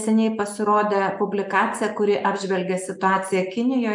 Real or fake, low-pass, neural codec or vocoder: real; 10.8 kHz; none